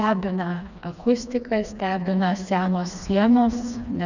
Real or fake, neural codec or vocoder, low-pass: fake; codec, 16 kHz, 2 kbps, FreqCodec, smaller model; 7.2 kHz